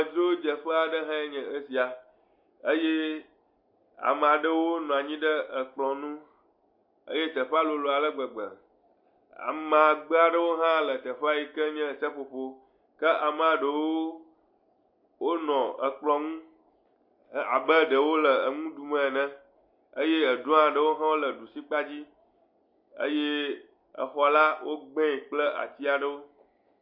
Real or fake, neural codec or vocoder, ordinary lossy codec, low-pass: real; none; MP3, 32 kbps; 5.4 kHz